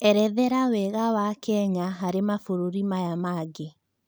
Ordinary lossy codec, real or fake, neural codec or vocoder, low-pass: none; real; none; none